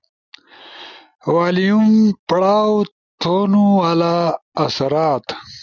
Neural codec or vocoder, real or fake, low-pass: none; real; 7.2 kHz